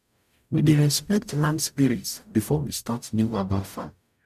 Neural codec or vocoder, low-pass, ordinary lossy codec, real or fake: codec, 44.1 kHz, 0.9 kbps, DAC; 14.4 kHz; none; fake